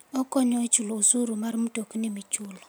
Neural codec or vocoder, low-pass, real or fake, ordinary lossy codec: none; none; real; none